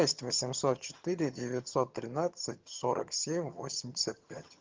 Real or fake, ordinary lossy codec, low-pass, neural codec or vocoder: fake; Opus, 32 kbps; 7.2 kHz; vocoder, 22.05 kHz, 80 mel bands, HiFi-GAN